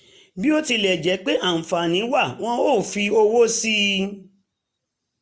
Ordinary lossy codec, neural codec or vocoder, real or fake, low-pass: none; none; real; none